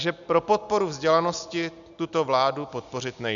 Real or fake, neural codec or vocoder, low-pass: real; none; 7.2 kHz